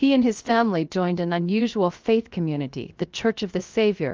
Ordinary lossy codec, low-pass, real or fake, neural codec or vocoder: Opus, 24 kbps; 7.2 kHz; fake; codec, 16 kHz, 0.7 kbps, FocalCodec